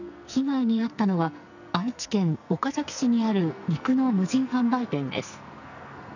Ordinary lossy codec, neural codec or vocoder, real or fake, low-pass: none; codec, 44.1 kHz, 2.6 kbps, SNAC; fake; 7.2 kHz